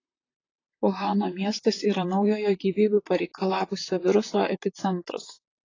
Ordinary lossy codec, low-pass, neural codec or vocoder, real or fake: AAC, 32 kbps; 7.2 kHz; vocoder, 44.1 kHz, 128 mel bands, Pupu-Vocoder; fake